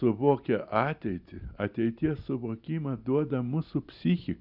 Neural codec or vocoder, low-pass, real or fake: none; 5.4 kHz; real